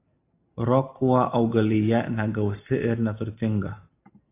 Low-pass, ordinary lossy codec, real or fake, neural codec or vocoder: 3.6 kHz; AAC, 24 kbps; real; none